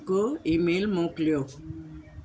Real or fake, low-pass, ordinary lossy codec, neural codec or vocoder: real; none; none; none